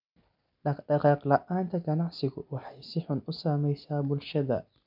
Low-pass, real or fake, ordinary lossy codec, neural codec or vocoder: 5.4 kHz; real; none; none